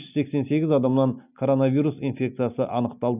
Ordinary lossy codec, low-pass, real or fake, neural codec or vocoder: none; 3.6 kHz; real; none